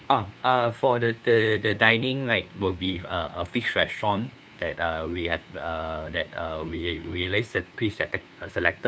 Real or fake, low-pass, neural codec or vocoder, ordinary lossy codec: fake; none; codec, 16 kHz, 2 kbps, FunCodec, trained on LibriTTS, 25 frames a second; none